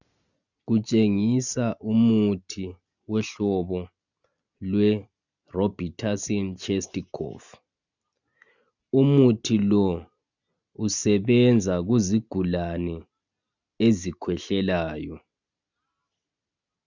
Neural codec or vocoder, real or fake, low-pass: none; real; 7.2 kHz